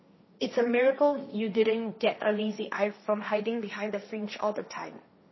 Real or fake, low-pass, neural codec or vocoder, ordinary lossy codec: fake; 7.2 kHz; codec, 16 kHz, 1.1 kbps, Voila-Tokenizer; MP3, 24 kbps